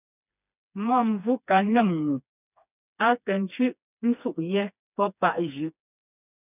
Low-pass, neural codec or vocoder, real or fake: 3.6 kHz; codec, 16 kHz, 2 kbps, FreqCodec, smaller model; fake